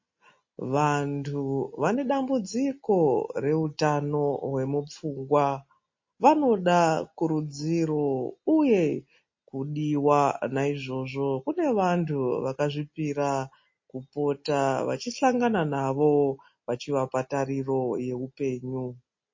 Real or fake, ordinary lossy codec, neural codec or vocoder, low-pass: real; MP3, 32 kbps; none; 7.2 kHz